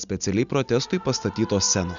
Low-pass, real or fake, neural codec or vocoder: 7.2 kHz; real; none